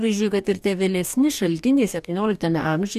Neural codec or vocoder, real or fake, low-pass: codec, 44.1 kHz, 2.6 kbps, DAC; fake; 14.4 kHz